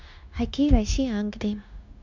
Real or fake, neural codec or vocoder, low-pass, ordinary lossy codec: fake; codec, 16 kHz, 0.9 kbps, LongCat-Audio-Codec; 7.2 kHz; MP3, 64 kbps